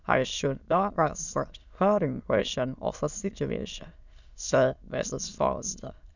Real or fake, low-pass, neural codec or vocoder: fake; 7.2 kHz; autoencoder, 22.05 kHz, a latent of 192 numbers a frame, VITS, trained on many speakers